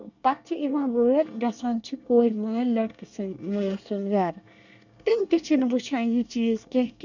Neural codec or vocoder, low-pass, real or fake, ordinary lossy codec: codec, 24 kHz, 1 kbps, SNAC; 7.2 kHz; fake; none